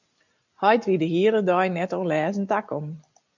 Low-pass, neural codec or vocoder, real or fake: 7.2 kHz; none; real